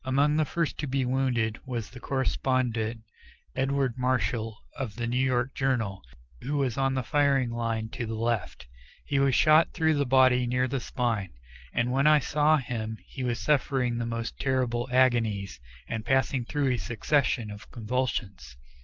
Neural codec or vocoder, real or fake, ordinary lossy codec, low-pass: none; real; Opus, 24 kbps; 7.2 kHz